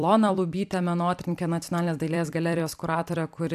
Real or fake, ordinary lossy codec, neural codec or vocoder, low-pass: fake; AAC, 96 kbps; vocoder, 44.1 kHz, 128 mel bands every 256 samples, BigVGAN v2; 14.4 kHz